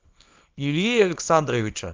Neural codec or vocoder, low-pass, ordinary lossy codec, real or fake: codec, 24 kHz, 0.9 kbps, WavTokenizer, small release; 7.2 kHz; Opus, 32 kbps; fake